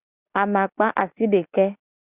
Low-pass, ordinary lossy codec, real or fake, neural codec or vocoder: 3.6 kHz; Opus, 64 kbps; real; none